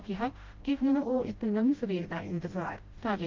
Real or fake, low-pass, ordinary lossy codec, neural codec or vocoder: fake; 7.2 kHz; Opus, 24 kbps; codec, 16 kHz, 0.5 kbps, FreqCodec, smaller model